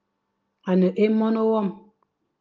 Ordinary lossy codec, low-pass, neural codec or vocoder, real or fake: Opus, 24 kbps; 7.2 kHz; none; real